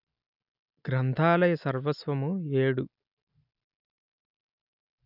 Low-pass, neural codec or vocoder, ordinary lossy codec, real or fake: 5.4 kHz; none; none; real